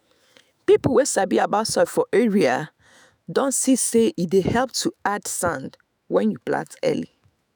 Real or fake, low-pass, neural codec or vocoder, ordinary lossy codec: fake; none; autoencoder, 48 kHz, 128 numbers a frame, DAC-VAE, trained on Japanese speech; none